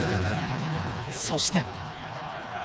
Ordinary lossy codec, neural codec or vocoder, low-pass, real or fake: none; codec, 16 kHz, 2 kbps, FreqCodec, smaller model; none; fake